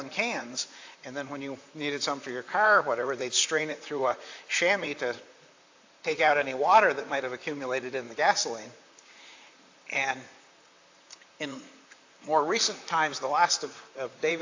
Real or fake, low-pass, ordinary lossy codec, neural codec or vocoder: fake; 7.2 kHz; AAC, 48 kbps; vocoder, 44.1 kHz, 128 mel bands, Pupu-Vocoder